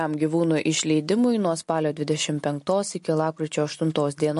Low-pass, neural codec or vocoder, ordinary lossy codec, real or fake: 14.4 kHz; none; MP3, 48 kbps; real